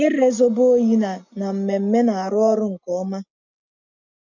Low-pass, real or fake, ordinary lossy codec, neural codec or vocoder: 7.2 kHz; real; none; none